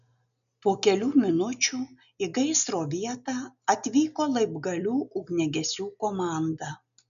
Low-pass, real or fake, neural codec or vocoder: 7.2 kHz; real; none